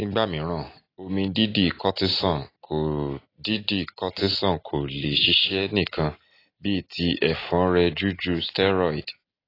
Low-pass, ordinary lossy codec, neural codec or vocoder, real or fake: 5.4 kHz; AAC, 24 kbps; none; real